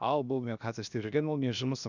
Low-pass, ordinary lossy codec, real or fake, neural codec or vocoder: 7.2 kHz; none; fake; codec, 16 kHz, 0.7 kbps, FocalCodec